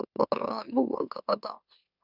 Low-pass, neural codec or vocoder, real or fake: 5.4 kHz; autoencoder, 44.1 kHz, a latent of 192 numbers a frame, MeloTTS; fake